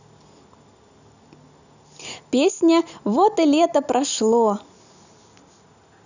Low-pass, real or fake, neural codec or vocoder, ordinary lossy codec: 7.2 kHz; real; none; none